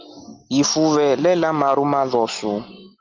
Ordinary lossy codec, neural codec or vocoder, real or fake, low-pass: Opus, 32 kbps; none; real; 7.2 kHz